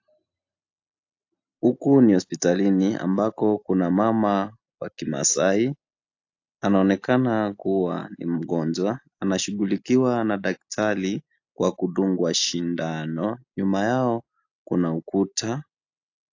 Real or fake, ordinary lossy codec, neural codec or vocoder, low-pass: real; AAC, 48 kbps; none; 7.2 kHz